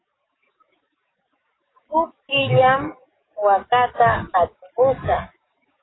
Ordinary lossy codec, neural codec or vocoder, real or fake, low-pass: AAC, 16 kbps; codec, 44.1 kHz, 7.8 kbps, DAC; fake; 7.2 kHz